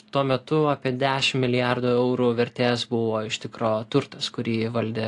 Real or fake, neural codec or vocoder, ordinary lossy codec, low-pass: real; none; AAC, 48 kbps; 10.8 kHz